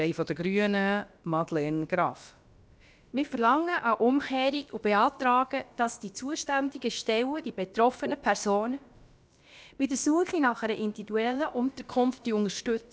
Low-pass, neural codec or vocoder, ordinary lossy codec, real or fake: none; codec, 16 kHz, about 1 kbps, DyCAST, with the encoder's durations; none; fake